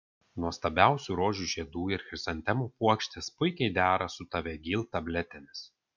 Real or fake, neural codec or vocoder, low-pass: real; none; 7.2 kHz